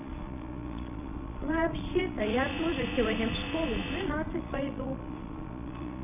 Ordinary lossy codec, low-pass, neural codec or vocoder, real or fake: none; 3.6 kHz; vocoder, 22.05 kHz, 80 mel bands, Vocos; fake